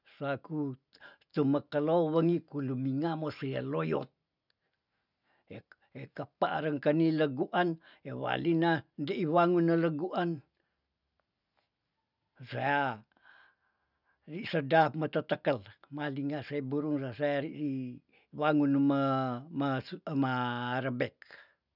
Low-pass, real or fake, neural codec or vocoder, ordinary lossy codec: 5.4 kHz; real; none; none